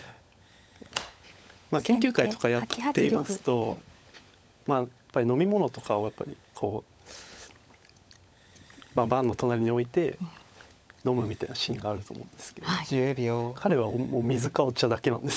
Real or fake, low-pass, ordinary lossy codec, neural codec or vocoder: fake; none; none; codec, 16 kHz, 16 kbps, FunCodec, trained on LibriTTS, 50 frames a second